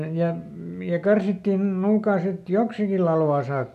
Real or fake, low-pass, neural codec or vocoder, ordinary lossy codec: real; 14.4 kHz; none; none